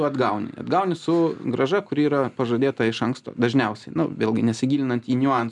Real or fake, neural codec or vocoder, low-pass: real; none; 10.8 kHz